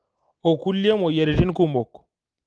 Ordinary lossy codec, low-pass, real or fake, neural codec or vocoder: Opus, 24 kbps; 9.9 kHz; real; none